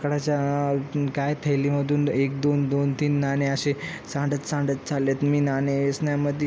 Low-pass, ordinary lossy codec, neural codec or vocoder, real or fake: none; none; none; real